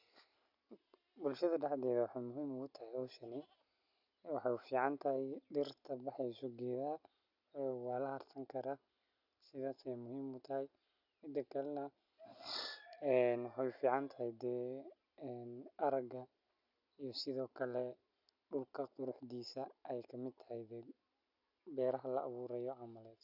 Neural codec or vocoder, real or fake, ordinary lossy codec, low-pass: none; real; none; 5.4 kHz